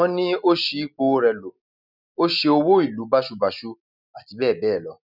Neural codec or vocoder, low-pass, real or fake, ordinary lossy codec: none; 5.4 kHz; real; none